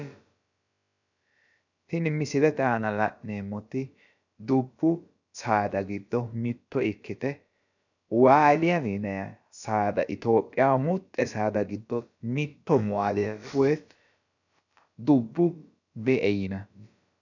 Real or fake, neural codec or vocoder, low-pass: fake; codec, 16 kHz, about 1 kbps, DyCAST, with the encoder's durations; 7.2 kHz